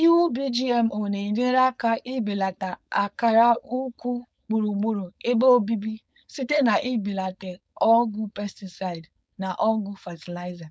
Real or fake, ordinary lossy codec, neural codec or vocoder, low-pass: fake; none; codec, 16 kHz, 4.8 kbps, FACodec; none